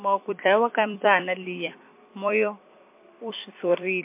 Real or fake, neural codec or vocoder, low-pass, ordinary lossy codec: fake; vocoder, 44.1 kHz, 128 mel bands every 256 samples, BigVGAN v2; 3.6 kHz; MP3, 24 kbps